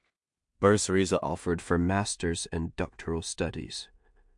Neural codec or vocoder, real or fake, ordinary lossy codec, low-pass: codec, 16 kHz in and 24 kHz out, 0.4 kbps, LongCat-Audio-Codec, two codebook decoder; fake; MP3, 64 kbps; 10.8 kHz